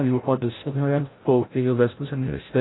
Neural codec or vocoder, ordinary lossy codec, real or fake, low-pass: codec, 16 kHz, 0.5 kbps, FreqCodec, larger model; AAC, 16 kbps; fake; 7.2 kHz